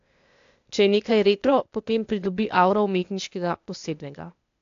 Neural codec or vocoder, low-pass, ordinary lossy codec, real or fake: codec, 16 kHz, 0.8 kbps, ZipCodec; 7.2 kHz; AAC, 64 kbps; fake